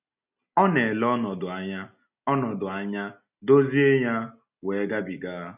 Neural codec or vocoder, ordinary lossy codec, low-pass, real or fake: none; none; 3.6 kHz; real